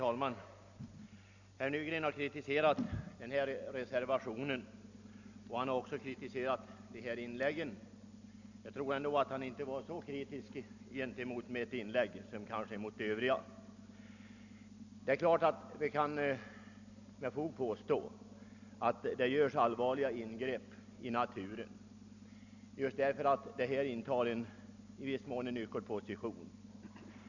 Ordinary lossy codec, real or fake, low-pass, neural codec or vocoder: none; real; 7.2 kHz; none